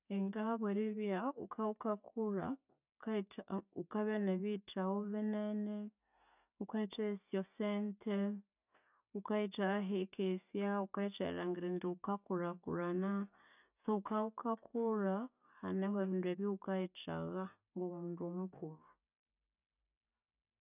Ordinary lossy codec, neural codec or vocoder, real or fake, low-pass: none; none; real; 3.6 kHz